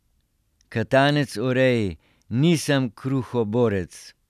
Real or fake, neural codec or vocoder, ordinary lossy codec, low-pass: real; none; none; 14.4 kHz